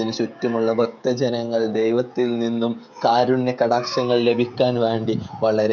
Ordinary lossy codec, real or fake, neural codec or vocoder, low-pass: none; fake; codec, 16 kHz, 16 kbps, FreqCodec, smaller model; 7.2 kHz